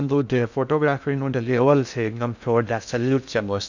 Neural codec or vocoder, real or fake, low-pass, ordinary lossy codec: codec, 16 kHz in and 24 kHz out, 0.6 kbps, FocalCodec, streaming, 2048 codes; fake; 7.2 kHz; none